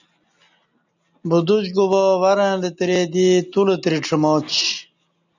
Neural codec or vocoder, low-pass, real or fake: none; 7.2 kHz; real